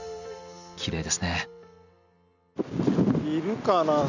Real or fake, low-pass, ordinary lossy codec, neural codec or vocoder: real; 7.2 kHz; none; none